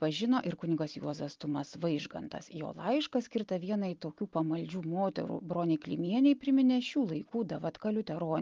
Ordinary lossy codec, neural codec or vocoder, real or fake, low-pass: Opus, 32 kbps; none; real; 7.2 kHz